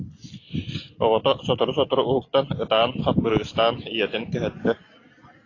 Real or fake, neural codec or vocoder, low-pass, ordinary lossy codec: real; none; 7.2 kHz; AAC, 32 kbps